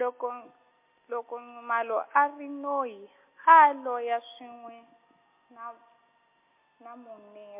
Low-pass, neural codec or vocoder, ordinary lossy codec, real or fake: 3.6 kHz; none; MP3, 24 kbps; real